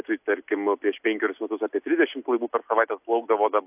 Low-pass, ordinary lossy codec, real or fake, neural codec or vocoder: 3.6 kHz; AAC, 32 kbps; real; none